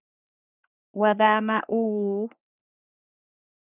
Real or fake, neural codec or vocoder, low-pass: fake; codec, 44.1 kHz, 3.4 kbps, Pupu-Codec; 3.6 kHz